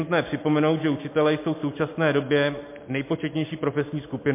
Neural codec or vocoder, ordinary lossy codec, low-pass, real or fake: none; MP3, 24 kbps; 3.6 kHz; real